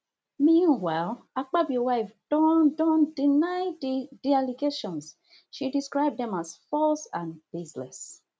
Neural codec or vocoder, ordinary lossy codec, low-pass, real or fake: none; none; none; real